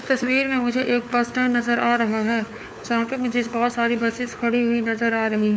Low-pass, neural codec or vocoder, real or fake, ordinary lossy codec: none; codec, 16 kHz, 4 kbps, FunCodec, trained on LibriTTS, 50 frames a second; fake; none